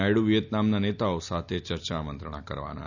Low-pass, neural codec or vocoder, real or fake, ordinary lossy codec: 7.2 kHz; none; real; none